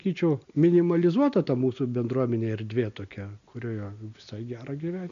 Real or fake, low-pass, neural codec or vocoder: real; 7.2 kHz; none